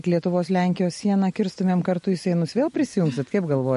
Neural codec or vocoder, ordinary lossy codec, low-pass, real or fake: none; MP3, 48 kbps; 14.4 kHz; real